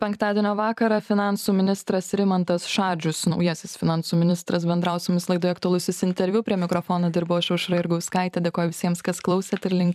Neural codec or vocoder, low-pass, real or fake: vocoder, 44.1 kHz, 128 mel bands every 256 samples, BigVGAN v2; 14.4 kHz; fake